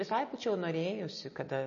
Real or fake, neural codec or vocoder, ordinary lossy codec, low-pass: real; none; MP3, 32 kbps; 7.2 kHz